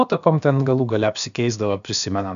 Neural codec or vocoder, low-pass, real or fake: codec, 16 kHz, about 1 kbps, DyCAST, with the encoder's durations; 7.2 kHz; fake